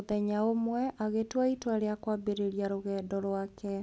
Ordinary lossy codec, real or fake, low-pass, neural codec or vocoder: none; real; none; none